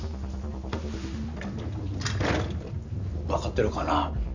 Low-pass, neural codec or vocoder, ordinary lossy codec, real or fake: 7.2 kHz; none; none; real